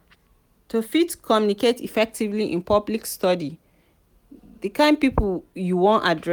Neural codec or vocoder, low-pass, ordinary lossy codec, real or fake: none; none; none; real